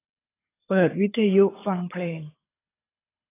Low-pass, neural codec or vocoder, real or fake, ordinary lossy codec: 3.6 kHz; codec, 24 kHz, 6 kbps, HILCodec; fake; AAC, 16 kbps